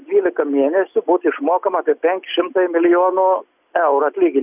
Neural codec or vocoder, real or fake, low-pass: none; real; 3.6 kHz